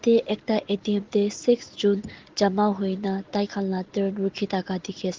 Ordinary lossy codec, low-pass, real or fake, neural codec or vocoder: Opus, 16 kbps; 7.2 kHz; real; none